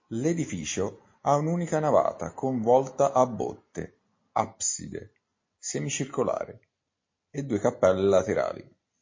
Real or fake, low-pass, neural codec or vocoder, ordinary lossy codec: real; 7.2 kHz; none; MP3, 32 kbps